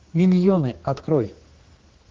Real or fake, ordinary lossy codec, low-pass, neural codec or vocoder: fake; Opus, 16 kbps; 7.2 kHz; codec, 16 kHz, 2 kbps, X-Codec, HuBERT features, trained on general audio